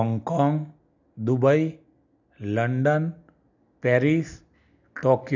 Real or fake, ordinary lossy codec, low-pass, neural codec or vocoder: real; none; 7.2 kHz; none